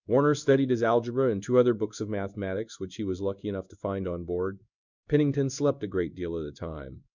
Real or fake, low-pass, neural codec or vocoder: fake; 7.2 kHz; codec, 16 kHz in and 24 kHz out, 1 kbps, XY-Tokenizer